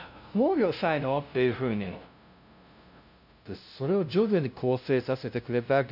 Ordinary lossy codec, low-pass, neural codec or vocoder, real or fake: none; 5.4 kHz; codec, 16 kHz, 0.5 kbps, FunCodec, trained on LibriTTS, 25 frames a second; fake